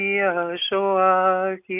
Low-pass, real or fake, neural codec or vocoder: 3.6 kHz; real; none